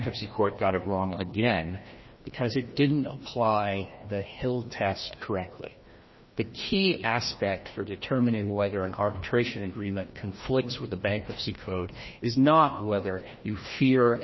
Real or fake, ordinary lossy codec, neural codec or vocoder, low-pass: fake; MP3, 24 kbps; codec, 16 kHz, 1 kbps, FreqCodec, larger model; 7.2 kHz